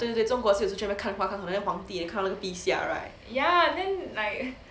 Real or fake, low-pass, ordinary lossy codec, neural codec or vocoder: real; none; none; none